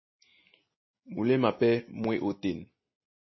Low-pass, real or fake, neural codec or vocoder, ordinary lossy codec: 7.2 kHz; real; none; MP3, 24 kbps